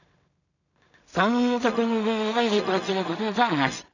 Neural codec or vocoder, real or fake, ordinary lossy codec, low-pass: codec, 16 kHz in and 24 kHz out, 0.4 kbps, LongCat-Audio-Codec, two codebook decoder; fake; none; 7.2 kHz